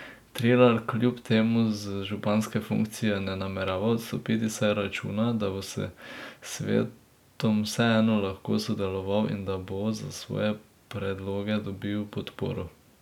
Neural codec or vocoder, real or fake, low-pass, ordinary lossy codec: none; real; 19.8 kHz; none